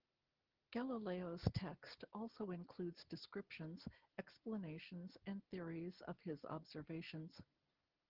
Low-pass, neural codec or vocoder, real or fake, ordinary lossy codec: 5.4 kHz; none; real; Opus, 16 kbps